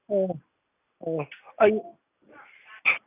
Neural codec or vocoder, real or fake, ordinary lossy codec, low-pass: none; real; none; 3.6 kHz